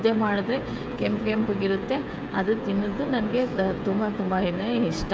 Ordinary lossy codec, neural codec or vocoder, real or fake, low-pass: none; codec, 16 kHz, 16 kbps, FreqCodec, smaller model; fake; none